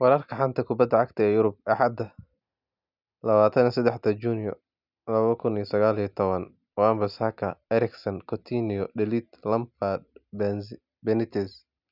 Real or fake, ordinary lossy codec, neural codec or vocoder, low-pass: real; none; none; 5.4 kHz